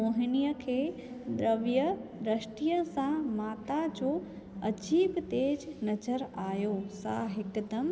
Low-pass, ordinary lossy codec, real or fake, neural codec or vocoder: none; none; real; none